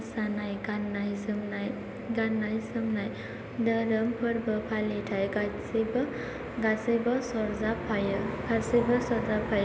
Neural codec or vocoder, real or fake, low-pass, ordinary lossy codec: none; real; none; none